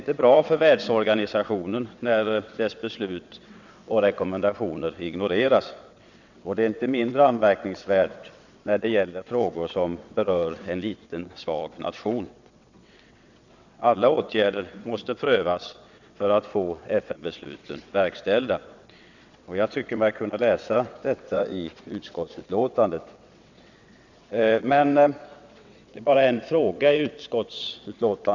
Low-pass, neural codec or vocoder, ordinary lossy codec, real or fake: 7.2 kHz; vocoder, 22.05 kHz, 80 mel bands, WaveNeXt; none; fake